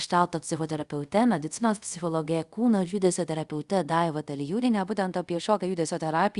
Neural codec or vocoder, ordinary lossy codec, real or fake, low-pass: codec, 24 kHz, 0.5 kbps, DualCodec; Opus, 64 kbps; fake; 10.8 kHz